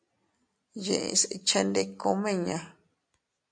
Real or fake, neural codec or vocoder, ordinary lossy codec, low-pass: real; none; AAC, 64 kbps; 9.9 kHz